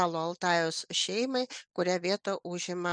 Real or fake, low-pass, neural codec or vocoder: real; 9.9 kHz; none